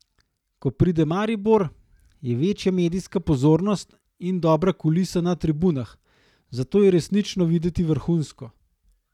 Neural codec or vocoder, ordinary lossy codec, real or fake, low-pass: none; none; real; 19.8 kHz